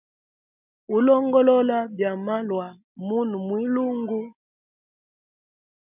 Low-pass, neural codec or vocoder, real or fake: 3.6 kHz; none; real